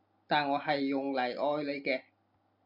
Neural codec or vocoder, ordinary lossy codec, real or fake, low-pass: none; MP3, 48 kbps; real; 5.4 kHz